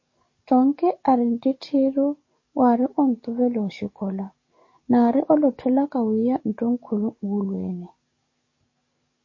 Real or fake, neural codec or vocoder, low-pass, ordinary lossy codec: fake; codec, 44.1 kHz, 7.8 kbps, DAC; 7.2 kHz; MP3, 32 kbps